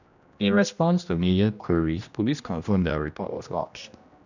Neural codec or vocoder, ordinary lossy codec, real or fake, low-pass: codec, 16 kHz, 1 kbps, X-Codec, HuBERT features, trained on general audio; none; fake; 7.2 kHz